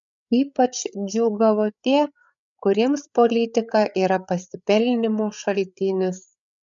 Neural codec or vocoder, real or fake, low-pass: codec, 16 kHz, 8 kbps, FreqCodec, larger model; fake; 7.2 kHz